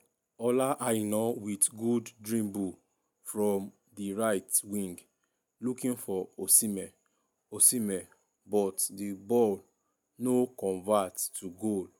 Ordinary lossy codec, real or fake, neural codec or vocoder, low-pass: none; real; none; none